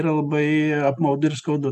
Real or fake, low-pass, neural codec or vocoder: real; 14.4 kHz; none